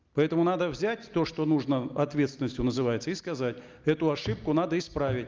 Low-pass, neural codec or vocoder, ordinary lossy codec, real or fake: 7.2 kHz; none; Opus, 24 kbps; real